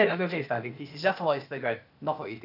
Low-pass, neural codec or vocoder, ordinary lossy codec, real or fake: 5.4 kHz; codec, 16 kHz, 0.8 kbps, ZipCodec; none; fake